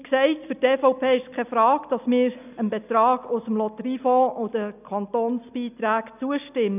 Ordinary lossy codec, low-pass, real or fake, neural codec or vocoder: none; 3.6 kHz; real; none